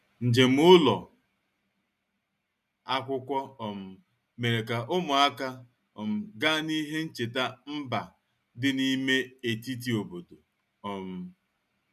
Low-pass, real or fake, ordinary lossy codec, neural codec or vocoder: 14.4 kHz; real; none; none